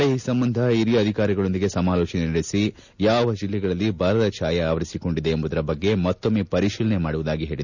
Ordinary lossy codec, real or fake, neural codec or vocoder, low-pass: none; real; none; 7.2 kHz